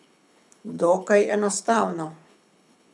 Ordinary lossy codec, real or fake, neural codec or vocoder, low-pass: none; fake; codec, 24 kHz, 6 kbps, HILCodec; none